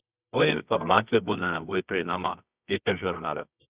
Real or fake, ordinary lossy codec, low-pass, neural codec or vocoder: fake; Opus, 64 kbps; 3.6 kHz; codec, 24 kHz, 0.9 kbps, WavTokenizer, medium music audio release